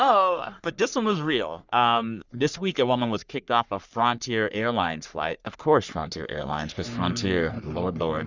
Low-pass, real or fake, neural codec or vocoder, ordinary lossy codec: 7.2 kHz; fake; codec, 44.1 kHz, 3.4 kbps, Pupu-Codec; Opus, 64 kbps